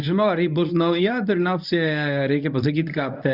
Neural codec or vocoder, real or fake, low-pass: codec, 24 kHz, 0.9 kbps, WavTokenizer, medium speech release version 1; fake; 5.4 kHz